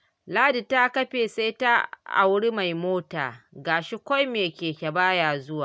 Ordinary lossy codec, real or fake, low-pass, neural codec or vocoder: none; real; none; none